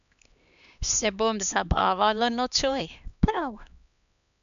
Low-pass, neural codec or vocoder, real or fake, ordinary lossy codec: 7.2 kHz; codec, 16 kHz, 2 kbps, X-Codec, HuBERT features, trained on LibriSpeech; fake; none